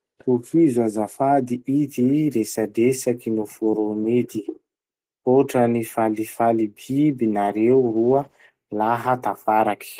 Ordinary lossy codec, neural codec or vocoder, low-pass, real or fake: Opus, 16 kbps; none; 14.4 kHz; real